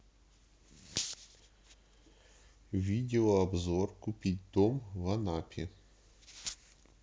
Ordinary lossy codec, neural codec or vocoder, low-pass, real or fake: none; none; none; real